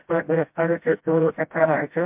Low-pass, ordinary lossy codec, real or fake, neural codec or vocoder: 3.6 kHz; MP3, 32 kbps; fake; codec, 16 kHz, 0.5 kbps, FreqCodec, smaller model